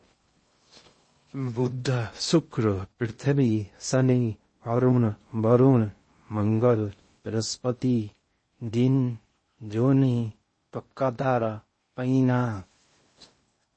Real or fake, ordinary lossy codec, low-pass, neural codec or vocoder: fake; MP3, 32 kbps; 9.9 kHz; codec, 16 kHz in and 24 kHz out, 0.6 kbps, FocalCodec, streaming, 2048 codes